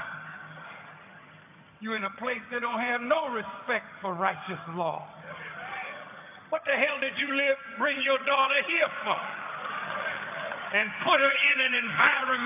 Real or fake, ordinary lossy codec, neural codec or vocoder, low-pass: fake; AAC, 32 kbps; vocoder, 22.05 kHz, 80 mel bands, HiFi-GAN; 3.6 kHz